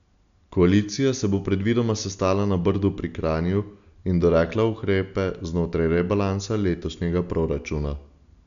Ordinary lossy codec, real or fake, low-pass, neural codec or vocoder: none; real; 7.2 kHz; none